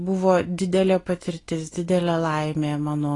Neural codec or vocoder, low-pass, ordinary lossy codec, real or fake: none; 10.8 kHz; AAC, 32 kbps; real